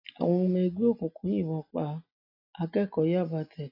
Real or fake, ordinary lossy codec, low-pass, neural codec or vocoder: real; AAC, 32 kbps; 5.4 kHz; none